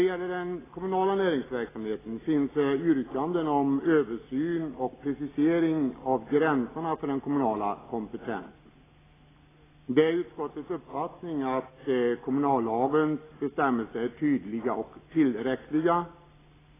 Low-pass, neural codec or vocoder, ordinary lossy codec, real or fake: 3.6 kHz; none; AAC, 16 kbps; real